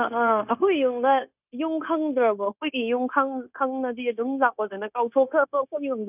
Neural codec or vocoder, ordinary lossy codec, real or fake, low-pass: codec, 16 kHz, 0.9 kbps, LongCat-Audio-Codec; none; fake; 3.6 kHz